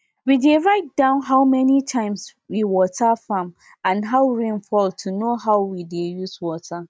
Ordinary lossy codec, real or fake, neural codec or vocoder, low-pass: none; real; none; none